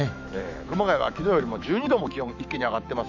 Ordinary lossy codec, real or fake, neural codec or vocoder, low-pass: none; fake; autoencoder, 48 kHz, 128 numbers a frame, DAC-VAE, trained on Japanese speech; 7.2 kHz